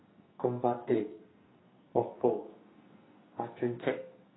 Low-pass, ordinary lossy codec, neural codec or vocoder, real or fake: 7.2 kHz; AAC, 16 kbps; codec, 44.1 kHz, 2.6 kbps, SNAC; fake